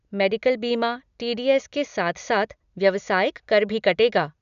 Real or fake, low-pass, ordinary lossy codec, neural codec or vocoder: real; 7.2 kHz; none; none